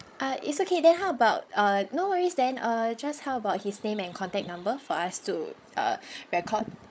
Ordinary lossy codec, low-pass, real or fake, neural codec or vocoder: none; none; fake; codec, 16 kHz, 16 kbps, FreqCodec, larger model